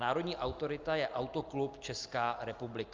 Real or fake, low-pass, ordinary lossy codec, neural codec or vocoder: real; 7.2 kHz; Opus, 32 kbps; none